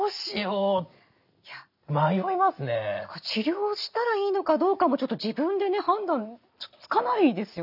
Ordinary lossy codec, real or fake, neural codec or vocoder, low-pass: none; real; none; 5.4 kHz